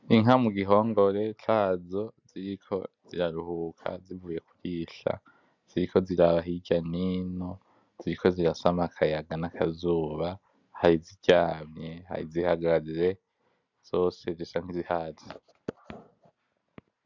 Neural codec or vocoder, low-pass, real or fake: none; 7.2 kHz; real